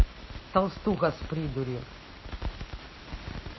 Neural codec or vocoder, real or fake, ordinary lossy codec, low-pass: none; real; MP3, 24 kbps; 7.2 kHz